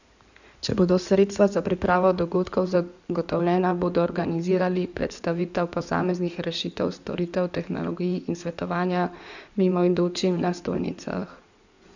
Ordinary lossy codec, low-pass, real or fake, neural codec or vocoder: none; 7.2 kHz; fake; codec, 16 kHz in and 24 kHz out, 2.2 kbps, FireRedTTS-2 codec